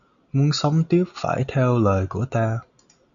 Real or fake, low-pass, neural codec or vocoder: real; 7.2 kHz; none